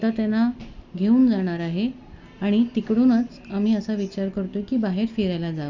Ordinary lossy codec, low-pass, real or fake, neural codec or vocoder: Opus, 64 kbps; 7.2 kHz; real; none